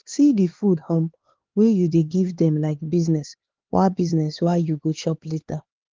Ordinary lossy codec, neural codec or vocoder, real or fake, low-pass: Opus, 16 kbps; codec, 16 kHz, 4 kbps, X-Codec, HuBERT features, trained on LibriSpeech; fake; 7.2 kHz